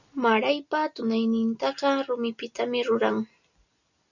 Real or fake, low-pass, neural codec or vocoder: real; 7.2 kHz; none